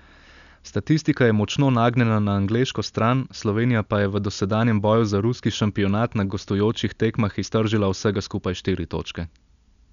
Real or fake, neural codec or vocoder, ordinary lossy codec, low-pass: real; none; none; 7.2 kHz